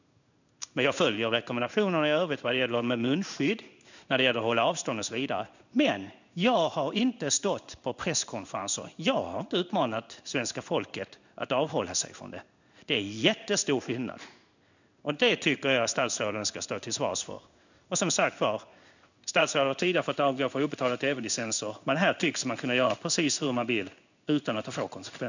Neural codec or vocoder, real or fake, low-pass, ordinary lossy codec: codec, 16 kHz in and 24 kHz out, 1 kbps, XY-Tokenizer; fake; 7.2 kHz; none